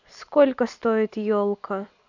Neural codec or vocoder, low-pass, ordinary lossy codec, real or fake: vocoder, 44.1 kHz, 128 mel bands every 256 samples, BigVGAN v2; 7.2 kHz; none; fake